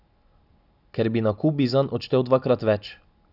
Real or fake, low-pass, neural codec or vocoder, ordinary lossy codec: real; 5.4 kHz; none; none